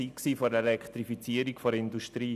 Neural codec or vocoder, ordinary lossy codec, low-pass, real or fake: none; none; 14.4 kHz; real